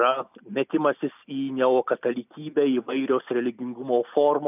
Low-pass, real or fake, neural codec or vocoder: 3.6 kHz; real; none